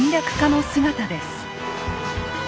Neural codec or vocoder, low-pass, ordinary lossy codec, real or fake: none; none; none; real